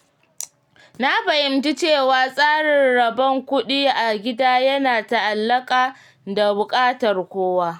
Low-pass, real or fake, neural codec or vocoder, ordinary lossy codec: 19.8 kHz; real; none; none